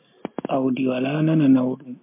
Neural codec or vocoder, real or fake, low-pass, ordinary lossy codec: none; real; 3.6 kHz; MP3, 24 kbps